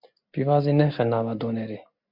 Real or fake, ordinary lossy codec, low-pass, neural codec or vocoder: real; MP3, 48 kbps; 5.4 kHz; none